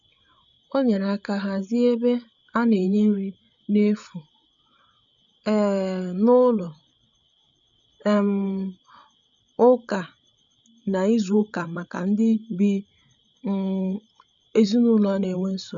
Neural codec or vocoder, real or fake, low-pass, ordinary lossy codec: codec, 16 kHz, 8 kbps, FreqCodec, larger model; fake; 7.2 kHz; none